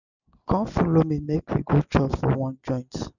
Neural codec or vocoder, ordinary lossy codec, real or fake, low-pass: none; none; real; 7.2 kHz